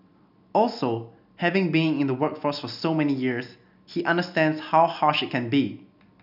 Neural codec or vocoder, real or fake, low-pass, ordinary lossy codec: none; real; 5.4 kHz; none